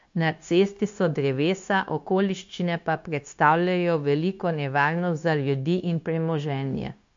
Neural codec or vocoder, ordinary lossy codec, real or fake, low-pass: codec, 16 kHz, 0.9 kbps, LongCat-Audio-Codec; MP3, 48 kbps; fake; 7.2 kHz